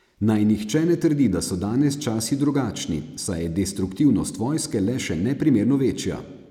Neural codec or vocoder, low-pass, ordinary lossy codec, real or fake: none; 19.8 kHz; none; real